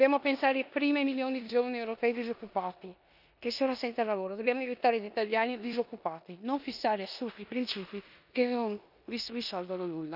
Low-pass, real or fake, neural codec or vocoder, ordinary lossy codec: 5.4 kHz; fake; codec, 16 kHz in and 24 kHz out, 0.9 kbps, LongCat-Audio-Codec, four codebook decoder; none